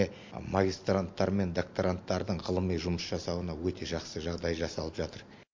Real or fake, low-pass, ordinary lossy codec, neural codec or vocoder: real; 7.2 kHz; MP3, 48 kbps; none